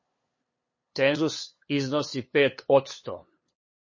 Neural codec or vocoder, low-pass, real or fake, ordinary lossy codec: codec, 16 kHz, 8 kbps, FunCodec, trained on LibriTTS, 25 frames a second; 7.2 kHz; fake; MP3, 32 kbps